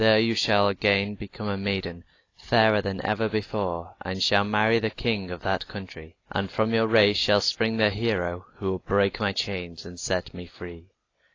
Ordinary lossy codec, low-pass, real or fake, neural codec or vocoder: AAC, 32 kbps; 7.2 kHz; real; none